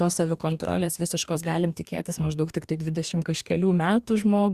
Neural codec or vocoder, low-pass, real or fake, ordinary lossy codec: codec, 44.1 kHz, 2.6 kbps, DAC; 14.4 kHz; fake; Opus, 64 kbps